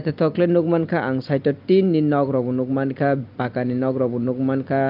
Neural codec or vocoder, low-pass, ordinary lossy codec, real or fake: none; 5.4 kHz; Opus, 24 kbps; real